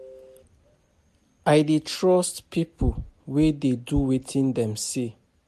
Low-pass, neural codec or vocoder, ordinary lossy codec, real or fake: 14.4 kHz; none; MP3, 64 kbps; real